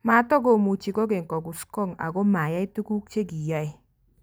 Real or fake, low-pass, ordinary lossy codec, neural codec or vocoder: real; none; none; none